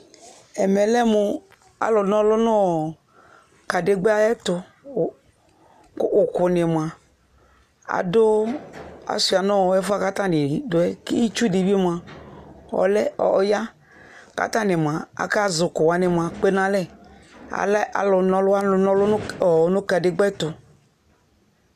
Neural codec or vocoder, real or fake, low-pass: none; real; 14.4 kHz